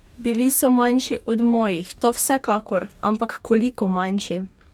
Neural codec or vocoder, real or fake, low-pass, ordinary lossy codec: codec, 44.1 kHz, 2.6 kbps, DAC; fake; 19.8 kHz; none